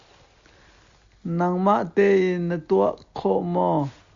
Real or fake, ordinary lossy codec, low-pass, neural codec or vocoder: real; Opus, 64 kbps; 7.2 kHz; none